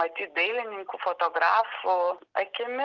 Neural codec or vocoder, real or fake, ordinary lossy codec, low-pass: none; real; Opus, 24 kbps; 7.2 kHz